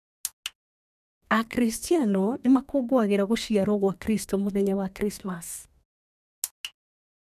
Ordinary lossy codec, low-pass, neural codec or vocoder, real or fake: none; 14.4 kHz; codec, 32 kHz, 1.9 kbps, SNAC; fake